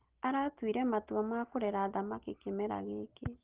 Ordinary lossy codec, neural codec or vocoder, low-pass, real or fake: Opus, 16 kbps; none; 3.6 kHz; real